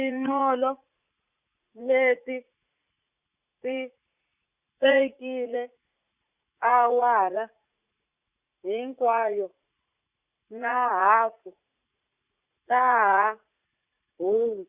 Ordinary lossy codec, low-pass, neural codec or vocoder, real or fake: Opus, 64 kbps; 3.6 kHz; vocoder, 44.1 kHz, 80 mel bands, Vocos; fake